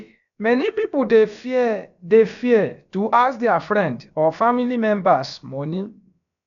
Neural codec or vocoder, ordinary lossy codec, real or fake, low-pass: codec, 16 kHz, about 1 kbps, DyCAST, with the encoder's durations; MP3, 96 kbps; fake; 7.2 kHz